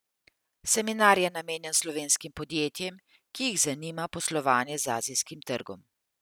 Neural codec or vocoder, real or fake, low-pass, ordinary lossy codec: none; real; none; none